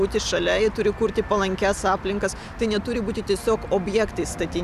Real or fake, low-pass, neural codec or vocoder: real; 14.4 kHz; none